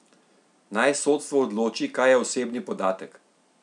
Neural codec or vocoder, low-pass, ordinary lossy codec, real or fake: none; 10.8 kHz; none; real